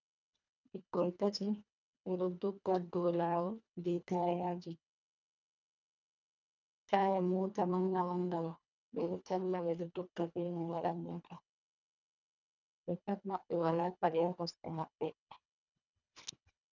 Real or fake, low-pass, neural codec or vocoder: fake; 7.2 kHz; codec, 24 kHz, 1.5 kbps, HILCodec